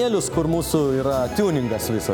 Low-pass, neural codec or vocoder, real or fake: 19.8 kHz; none; real